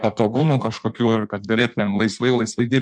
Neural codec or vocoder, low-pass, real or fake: codec, 16 kHz in and 24 kHz out, 1.1 kbps, FireRedTTS-2 codec; 9.9 kHz; fake